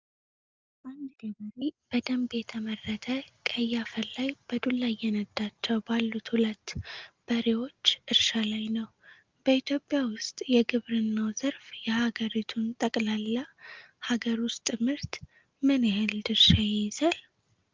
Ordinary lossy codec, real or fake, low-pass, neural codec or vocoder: Opus, 32 kbps; real; 7.2 kHz; none